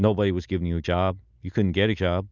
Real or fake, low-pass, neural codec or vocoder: real; 7.2 kHz; none